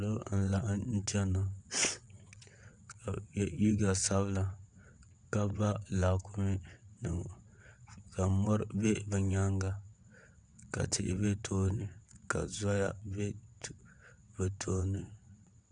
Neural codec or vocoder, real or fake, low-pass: vocoder, 22.05 kHz, 80 mel bands, WaveNeXt; fake; 9.9 kHz